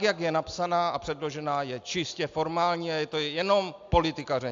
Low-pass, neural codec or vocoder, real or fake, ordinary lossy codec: 7.2 kHz; none; real; AAC, 64 kbps